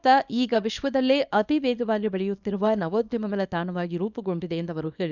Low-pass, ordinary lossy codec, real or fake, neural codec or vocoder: 7.2 kHz; none; fake; codec, 24 kHz, 0.9 kbps, WavTokenizer, small release